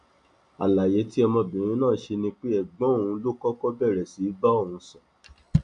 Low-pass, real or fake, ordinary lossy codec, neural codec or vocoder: 9.9 kHz; real; none; none